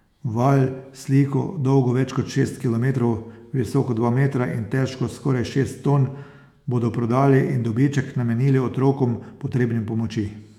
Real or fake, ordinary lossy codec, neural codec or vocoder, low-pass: fake; none; autoencoder, 48 kHz, 128 numbers a frame, DAC-VAE, trained on Japanese speech; 19.8 kHz